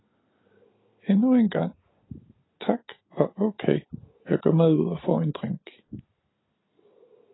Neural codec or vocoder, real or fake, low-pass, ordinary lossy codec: none; real; 7.2 kHz; AAC, 16 kbps